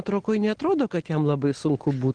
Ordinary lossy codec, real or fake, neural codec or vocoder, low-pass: Opus, 16 kbps; real; none; 9.9 kHz